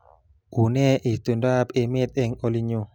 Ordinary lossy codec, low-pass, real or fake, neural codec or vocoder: none; 19.8 kHz; real; none